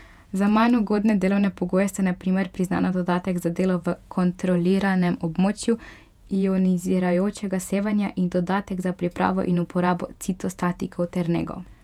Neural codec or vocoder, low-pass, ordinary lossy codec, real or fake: vocoder, 48 kHz, 128 mel bands, Vocos; 19.8 kHz; none; fake